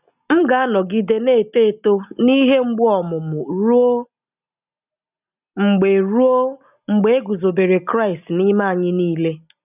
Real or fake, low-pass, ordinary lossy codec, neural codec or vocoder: real; 3.6 kHz; none; none